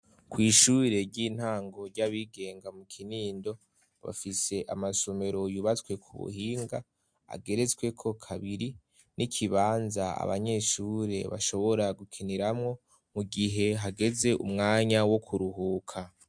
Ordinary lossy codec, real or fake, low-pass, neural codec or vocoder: MP3, 64 kbps; real; 9.9 kHz; none